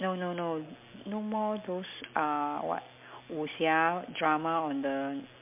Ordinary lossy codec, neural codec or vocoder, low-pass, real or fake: MP3, 32 kbps; none; 3.6 kHz; real